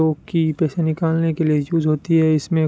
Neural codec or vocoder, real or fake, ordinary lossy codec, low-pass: none; real; none; none